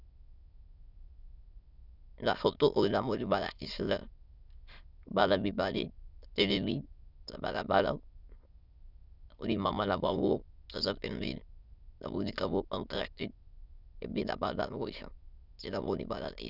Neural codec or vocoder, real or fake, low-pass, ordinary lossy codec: autoencoder, 22.05 kHz, a latent of 192 numbers a frame, VITS, trained on many speakers; fake; 5.4 kHz; Opus, 64 kbps